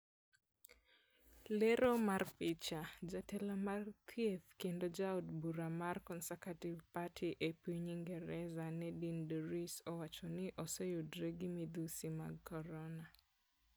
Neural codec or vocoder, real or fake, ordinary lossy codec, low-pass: none; real; none; none